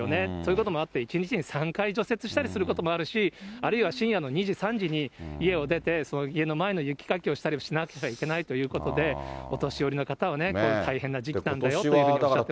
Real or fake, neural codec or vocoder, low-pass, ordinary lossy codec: real; none; none; none